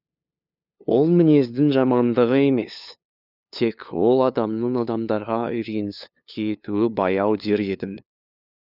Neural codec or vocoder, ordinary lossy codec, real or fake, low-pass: codec, 16 kHz, 2 kbps, FunCodec, trained on LibriTTS, 25 frames a second; none; fake; 5.4 kHz